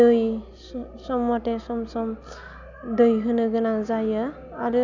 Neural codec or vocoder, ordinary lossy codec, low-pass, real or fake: none; none; 7.2 kHz; real